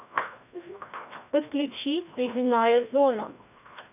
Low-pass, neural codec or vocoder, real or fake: 3.6 kHz; codec, 16 kHz, 1 kbps, FreqCodec, larger model; fake